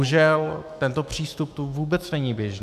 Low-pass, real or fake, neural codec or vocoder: 14.4 kHz; fake; autoencoder, 48 kHz, 128 numbers a frame, DAC-VAE, trained on Japanese speech